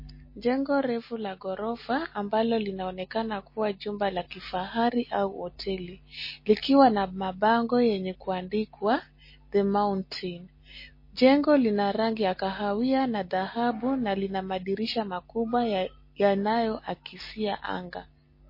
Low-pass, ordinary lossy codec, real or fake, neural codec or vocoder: 5.4 kHz; MP3, 24 kbps; real; none